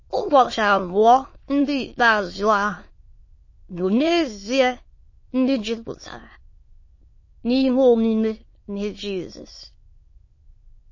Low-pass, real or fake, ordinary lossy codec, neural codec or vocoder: 7.2 kHz; fake; MP3, 32 kbps; autoencoder, 22.05 kHz, a latent of 192 numbers a frame, VITS, trained on many speakers